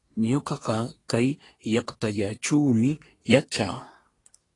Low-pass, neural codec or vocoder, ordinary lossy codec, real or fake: 10.8 kHz; codec, 24 kHz, 1 kbps, SNAC; AAC, 32 kbps; fake